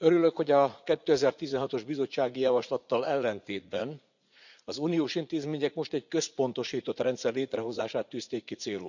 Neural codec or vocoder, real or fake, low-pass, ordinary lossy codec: vocoder, 44.1 kHz, 80 mel bands, Vocos; fake; 7.2 kHz; none